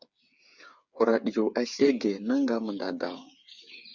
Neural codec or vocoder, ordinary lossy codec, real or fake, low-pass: codec, 16 kHz, 8 kbps, FreqCodec, smaller model; Opus, 64 kbps; fake; 7.2 kHz